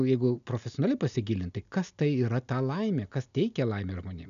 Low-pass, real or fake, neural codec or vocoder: 7.2 kHz; real; none